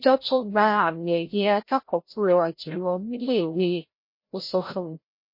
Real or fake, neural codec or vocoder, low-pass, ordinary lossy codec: fake; codec, 16 kHz, 0.5 kbps, FreqCodec, larger model; 5.4 kHz; MP3, 32 kbps